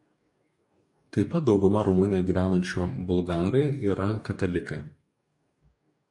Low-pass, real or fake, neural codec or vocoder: 10.8 kHz; fake; codec, 44.1 kHz, 2.6 kbps, DAC